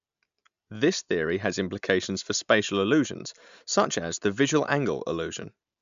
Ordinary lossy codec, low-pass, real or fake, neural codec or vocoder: MP3, 64 kbps; 7.2 kHz; real; none